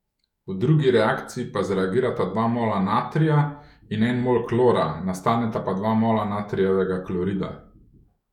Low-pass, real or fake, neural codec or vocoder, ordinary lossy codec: 19.8 kHz; fake; autoencoder, 48 kHz, 128 numbers a frame, DAC-VAE, trained on Japanese speech; none